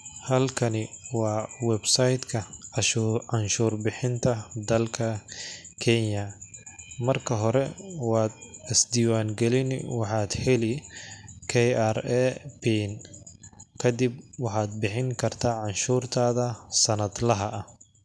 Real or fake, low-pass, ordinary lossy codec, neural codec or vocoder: real; none; none; none